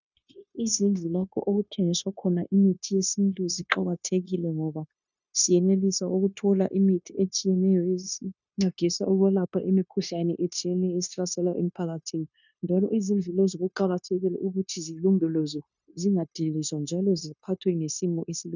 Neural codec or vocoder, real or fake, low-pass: codec, 16 kHz, 0.9 kbps, LongCat-Audio-Codec; fake; 7.2 kHz